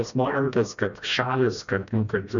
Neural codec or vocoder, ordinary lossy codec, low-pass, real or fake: codec, 16 kHz, 1 kbps, FreqCodec, smaller model; MP3, 96 kbps; 7.2 kHz; fake